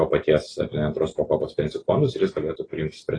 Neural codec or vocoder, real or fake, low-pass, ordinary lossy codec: none; real; 9.9 kHz; AAC, 32 kbps